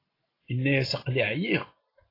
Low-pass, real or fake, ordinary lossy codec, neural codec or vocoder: 5.4 kHz; real; AAC, 24 kbps; none